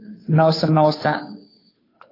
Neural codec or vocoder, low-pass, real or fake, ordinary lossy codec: codec, 16 kHz, 2 kbps, FunCodec, trained on Chinese and English, 25 frames a second; 5.4 kHz; fake; AAC, 24 kbps